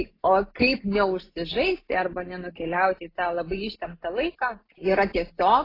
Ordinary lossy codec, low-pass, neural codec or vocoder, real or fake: AAC, 24 kbps; 5.4 kHz; none; real